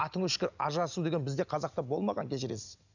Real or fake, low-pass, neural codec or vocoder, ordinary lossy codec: real; 7.2 kHz; none; none